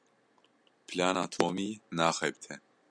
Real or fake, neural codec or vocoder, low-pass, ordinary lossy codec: real; none; 9.9 kHz; MP3, 96 kbps